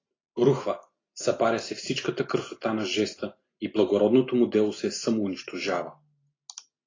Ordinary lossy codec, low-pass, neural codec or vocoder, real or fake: AAC, 32 kbps; 7.2 kHz; none; real